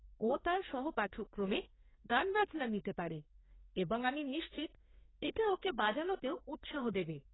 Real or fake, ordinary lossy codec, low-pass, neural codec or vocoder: fake; AAC, 16 kbps; 7.2 kHz; codec, 32 kHz, 1.9 kbps, SNAC